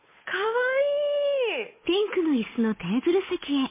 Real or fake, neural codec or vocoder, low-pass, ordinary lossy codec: fake; codec, 16 kHz, 8 kbps, FunCodec, trained on Chinese and English, 25 frames a second; 3.6 kHz; MP3, 16 kbps